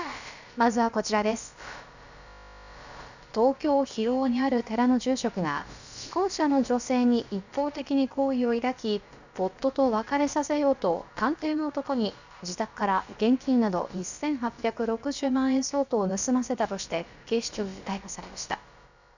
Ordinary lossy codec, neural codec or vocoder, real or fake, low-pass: none; codec, 16 kHz, about 1 kbps, DyCAST, with the encoder's durations; fake; 7.2 kHz